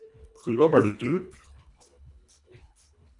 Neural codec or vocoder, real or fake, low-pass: codec, 24 kHz, 1.5 kbps, HILCodec; fake; 10.8 kHz